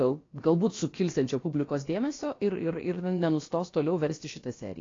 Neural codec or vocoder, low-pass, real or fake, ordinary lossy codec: codec, 16 kHz, about 1 kbps, DyCAST, with the encoder's durations; 7.2 kHz; fake; AAC, 32 kbps